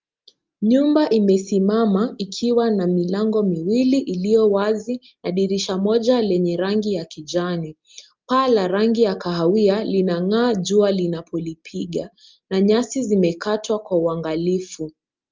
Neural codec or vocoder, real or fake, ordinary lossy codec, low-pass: none; real; Opus, 24 kbps; 7.2 kHz